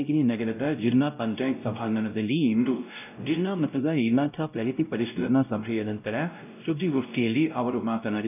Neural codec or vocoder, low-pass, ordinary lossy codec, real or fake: codec, 16 kHz, 0.5 kbps, X-Codec, WavLM features, trained on Multilingual LibriSpeech; 3.6 kHz; none; fake